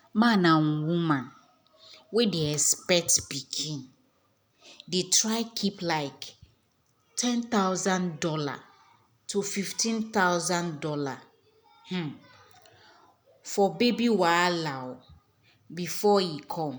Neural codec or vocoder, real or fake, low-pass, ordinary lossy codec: none; real; none; none